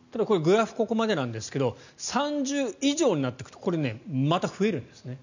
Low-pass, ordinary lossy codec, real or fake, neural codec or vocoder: 7.2 kHz; none; real; none